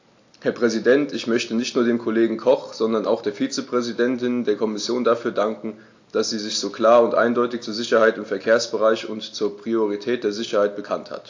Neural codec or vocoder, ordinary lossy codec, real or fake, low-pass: none; AAC, 48 kbps; real; 7.2 kHz